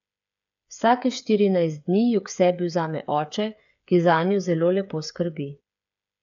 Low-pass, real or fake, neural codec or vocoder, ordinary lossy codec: 7.2 kHz; fake; codec, 16 kHz, 8 kbps, FreqCodec, smaller model; none